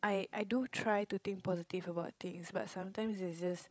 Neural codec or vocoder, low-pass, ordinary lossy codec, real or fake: codec, 16 kHz, 16 kbps, FreqCodec, larger model; none; none; fake